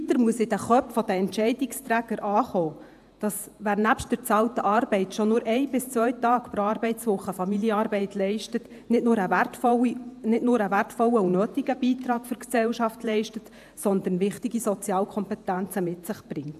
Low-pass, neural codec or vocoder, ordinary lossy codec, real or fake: 14.4 kHz; vocoder, 48 kHz, 128 mel bands, Vocos; AAC, 96 kbps; fake